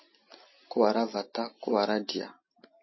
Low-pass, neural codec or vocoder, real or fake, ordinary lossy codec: 7.2 kHz; none; real; MP3, 24 kbps